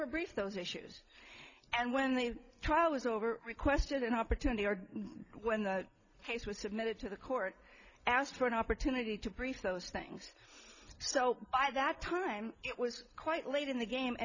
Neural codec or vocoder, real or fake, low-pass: none; real; 7.2 kHz